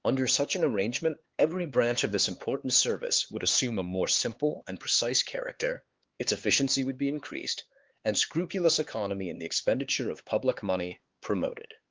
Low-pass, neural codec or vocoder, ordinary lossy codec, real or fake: 7.2 kHz; codec, 16 kHz, 4 kbps, X-Codec, HuBERT features, trained on LibriSpeech; Opus, 16 kbps; fake